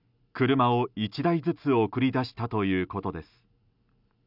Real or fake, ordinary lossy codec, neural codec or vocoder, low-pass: real; none; none; 5.4 kHz